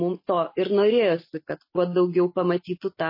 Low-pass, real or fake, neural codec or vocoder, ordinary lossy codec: 5.4 kHz; real; none; MP3, 24 kbps